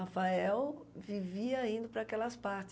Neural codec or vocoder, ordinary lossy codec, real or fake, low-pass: none; none; real; none